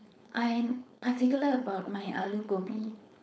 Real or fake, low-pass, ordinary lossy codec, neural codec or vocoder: fake; none; none; codec, 16 kHz, 4.8 kbps, FACodec